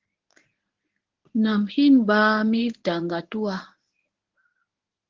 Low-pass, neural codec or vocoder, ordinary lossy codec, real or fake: 7.2 kHz; codec, 24 kHz, 0.9 kbps, WavTokenizer, medium speech release version 1; Opus, 16 kbps; fake